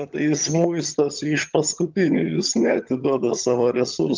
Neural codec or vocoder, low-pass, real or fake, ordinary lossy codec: vocoder, 22.05 kHz, 80 mel bands, HiFi-GAN; 7.2 kHz; fake; Opus, 32 kbps